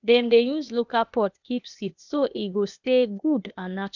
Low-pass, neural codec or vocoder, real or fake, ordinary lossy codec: 7.2 kHz; codec, 16 kHz, 0.8 kbps, ZipCodec; fake; none